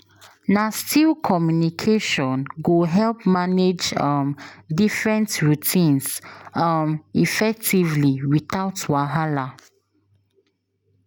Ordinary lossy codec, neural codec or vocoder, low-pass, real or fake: none; none; none; real